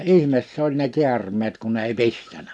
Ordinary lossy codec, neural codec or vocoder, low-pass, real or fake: none; none; none; real